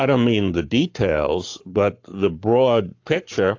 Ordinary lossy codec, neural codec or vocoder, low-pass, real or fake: AAC, 48 kbps; codec, 44.1 kHz, 7.8 kbps, Pupu-Codec; 7.2 kHz; fake